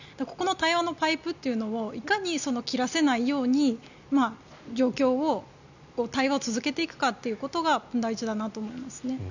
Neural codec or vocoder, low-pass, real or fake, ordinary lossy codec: none; 7.2 kHz; real; none